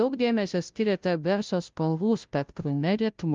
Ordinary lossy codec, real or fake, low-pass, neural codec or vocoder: Opus, 24 kbps; fake; 7.2 kHz; codec, 16 kHz, 0.5 kbps, FunCodec, trained on Chinese and English, 25 frames a second